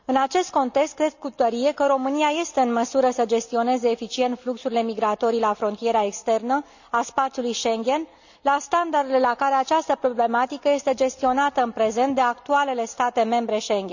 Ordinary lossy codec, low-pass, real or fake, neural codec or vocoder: none; 7.2 kHz; real; none